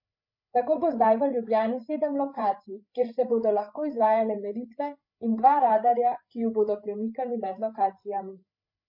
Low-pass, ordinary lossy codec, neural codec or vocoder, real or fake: 5.4 kHz; MP3, 32 kbps; codec, 16 kHz, 8 kbps, FreqCodec, larger model; fake